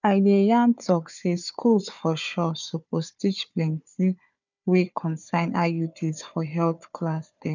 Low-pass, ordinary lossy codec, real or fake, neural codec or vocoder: 7.2 kHz; none; fake; codec, 16 kHz, 4 kbps, FunCodec, trained on Chinese and English, 50 frames a second